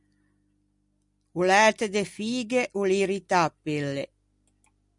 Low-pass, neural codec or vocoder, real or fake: 10.8 kHz; none; real